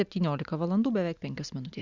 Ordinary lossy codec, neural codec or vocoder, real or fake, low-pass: Opus, 64 kbps; none; real; 7.2 kHz